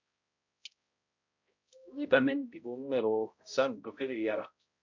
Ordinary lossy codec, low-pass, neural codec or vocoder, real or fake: MP3, 48 kbps; 7.2 kHz; codec, 16 kHz, 0.5 kbps, X-Codec, HuBERT features, trained on balanced general audio; fake